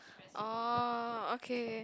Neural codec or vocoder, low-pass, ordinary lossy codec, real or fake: none; none; none; real